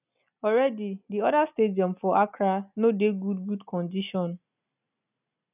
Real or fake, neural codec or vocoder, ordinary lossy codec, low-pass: real; none; none; 3.6 kHz